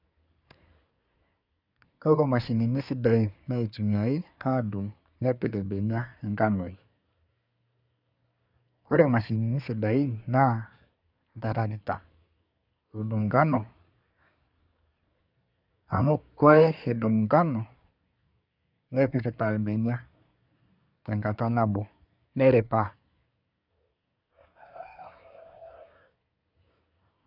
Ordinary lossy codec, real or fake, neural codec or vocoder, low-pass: none; fake; codec, 24 kHz, 1 kbps, SNAC; 5.4 kHz